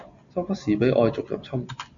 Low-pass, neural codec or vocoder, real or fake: 7.2 kHz; none; real